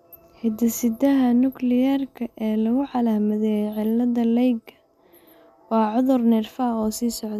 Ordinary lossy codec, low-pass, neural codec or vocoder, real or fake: none; 14.4 kHz; none; real